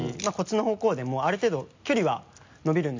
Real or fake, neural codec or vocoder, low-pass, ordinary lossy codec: real; none; 7.2 kHz; MP3, 64 kbps